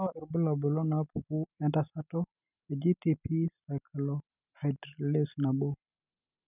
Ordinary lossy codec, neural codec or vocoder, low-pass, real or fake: none; none; 3.6 kHz; real